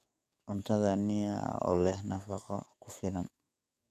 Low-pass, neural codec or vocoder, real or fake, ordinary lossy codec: 14.4 kHz; codec, 44.1 kHz, 7.8 kbps, DAC; fake; none